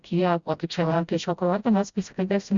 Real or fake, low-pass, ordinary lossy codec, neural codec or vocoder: fake; 7.2 kHz; Opus, 64 kbps; codec, 16 kHz, 0.5 kbps, FreqCodec, smaller model